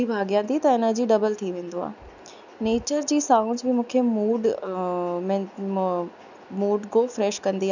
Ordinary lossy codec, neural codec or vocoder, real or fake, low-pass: none; none; real; 7.2 kHz